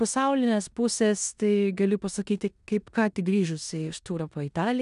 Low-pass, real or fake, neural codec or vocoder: 10.8 kHz; fake; codec, 16 kHz in and 24 kHz out, 0.9 kbps, LongCat-Audio-Codec, four codebook decoder